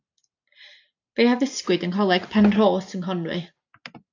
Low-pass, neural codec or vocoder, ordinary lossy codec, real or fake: 7.2 kHz; none; AAC, 48 kbps; real